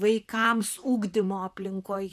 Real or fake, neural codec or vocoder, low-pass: fake; vocoder, 44.1 kHz, 128 mel bands, Pupu-Vocoder; 14.4 kHz